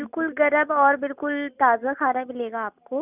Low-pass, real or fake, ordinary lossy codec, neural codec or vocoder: 3.6 kHz; real; none; none